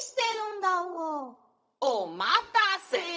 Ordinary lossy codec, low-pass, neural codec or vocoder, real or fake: none; none; codec, 16 kHz, 0.4 kbps, LongCat-Audio-Codec; fake